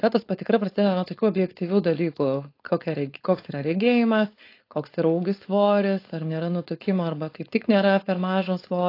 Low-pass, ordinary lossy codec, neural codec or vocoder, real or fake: 5.4 kHz; AAC, 32 kbps; codec, 16 kHz, 4.8 kbps, FACodec; fake